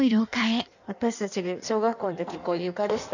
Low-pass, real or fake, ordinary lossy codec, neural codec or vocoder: 7.2 kHz; fake; none; codec, 16 kHz in and 24 kHz out, 1.1 kbps, FireRedTTS-2 codec